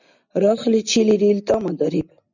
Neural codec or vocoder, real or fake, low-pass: none; real; 7.2 kHz